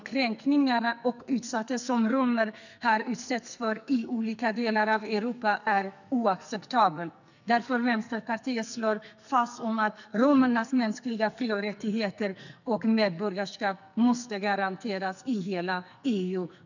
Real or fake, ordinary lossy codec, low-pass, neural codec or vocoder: fake; none; 7.2 kHz; codec, 44.1 kHz, 2.6 kbps, SNAC